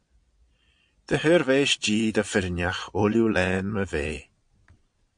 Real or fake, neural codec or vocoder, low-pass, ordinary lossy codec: fake; vocoder, 22.05 kHz, 80 mel bands, Vocos; 9.9 kHz; MP3, 64 kbps